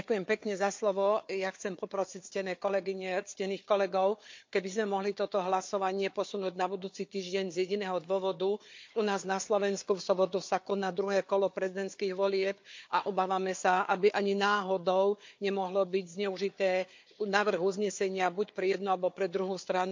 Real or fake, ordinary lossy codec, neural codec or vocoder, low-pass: fake; MP3, 48 kbps; codec, 16 kHz, 4 kbps, FunCodec, trained on Chinese and English, 50 frames a second; 7.2 kHz